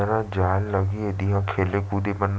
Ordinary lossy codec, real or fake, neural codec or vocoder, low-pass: none; real; none; none